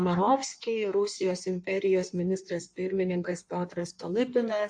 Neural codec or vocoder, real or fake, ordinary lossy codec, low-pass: codec, 16 kHz in and 24 kHz out, 1.1 kbps, FireRedTTS-2 codec; fake; AAC, 64 kbps; 9.9 kHz